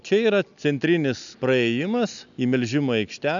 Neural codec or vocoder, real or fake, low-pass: none; real; 7.2 kHz